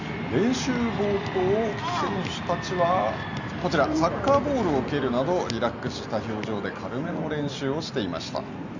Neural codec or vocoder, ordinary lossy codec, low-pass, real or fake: none; none; 7.2 kHz; real